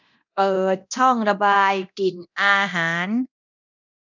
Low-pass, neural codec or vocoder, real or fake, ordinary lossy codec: 7.2 kHz; codec, 24 kHz, 0.9 kbps, DualCodec; fake; none